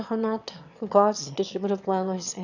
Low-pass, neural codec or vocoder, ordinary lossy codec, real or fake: 7.2 kHz; autoencoder, 22.05 kHz, a latent of 192 numbers a frame, VITS, trained on one speaker; none; fake